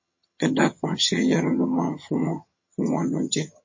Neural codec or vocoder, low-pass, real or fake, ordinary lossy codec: vocoder, 22.05 kHz, 80 mel bands, HiFi-GAN; 7.2 kHz; fake; MP3, 32 kbps